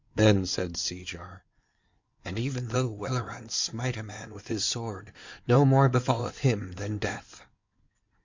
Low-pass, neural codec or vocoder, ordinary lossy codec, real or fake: 7.2 kHz; codec, 16 kHz in and 24 kHz out, 2.2 kbps, FireRedTTS-2 codec; MP3, 64 kbps; fake